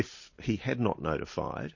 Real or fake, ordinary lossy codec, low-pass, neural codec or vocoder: real; MP3, 32 kbps; 7.2 kHz; none